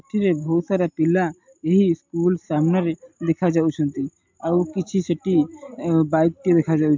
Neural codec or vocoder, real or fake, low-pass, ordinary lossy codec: none; real; 7.2 kHz; MP3, 64 kbps